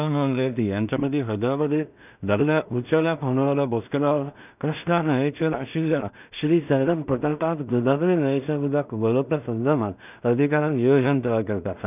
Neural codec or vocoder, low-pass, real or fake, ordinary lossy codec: codec, 16 kHz in and 24 kHz out, 0.4 kbps, LongCat-Audio-Codec, two codebook decoder; 3.6 kHz; fake; none